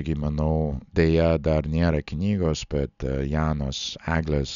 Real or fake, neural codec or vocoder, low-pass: real; none; 7.2 kHz